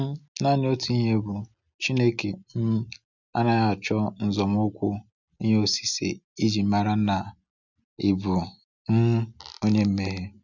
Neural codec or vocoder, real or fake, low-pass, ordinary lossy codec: none; real; 7.2 kHz; none